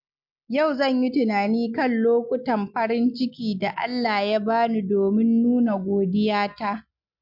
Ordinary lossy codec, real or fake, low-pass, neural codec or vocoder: MP3, 48 kbps; real; 5.4 kHz; none